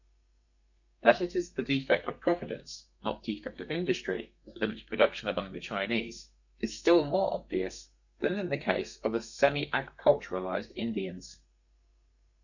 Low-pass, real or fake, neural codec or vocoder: 7.2 kHz; fake; codec, 32 kHz, 1.9 kbps, SNAC